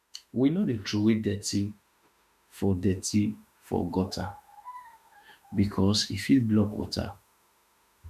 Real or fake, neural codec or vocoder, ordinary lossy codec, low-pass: fake; autoencoder, 48 kHz, 32 numbers a frame, DAC-VAE, trained on Japanese speech; none; 14.4 kHz